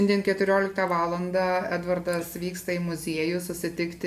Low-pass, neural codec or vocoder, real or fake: 14.4 kHz; none; real